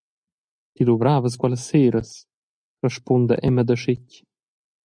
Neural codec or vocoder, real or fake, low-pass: none; real; 9.9 kHz